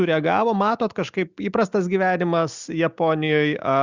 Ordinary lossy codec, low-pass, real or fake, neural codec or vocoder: Opus, 64 kbps; 7.2 kHz; real; none